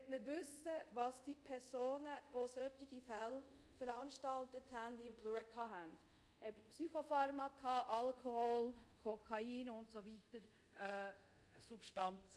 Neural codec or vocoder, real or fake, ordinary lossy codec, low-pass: codec, 24 kHz, 0.5 kbps, DualCodec; fake; none; none